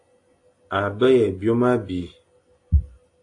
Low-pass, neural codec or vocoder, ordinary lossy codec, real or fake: 10.8 kHz; none; AAC, 48 kbps; real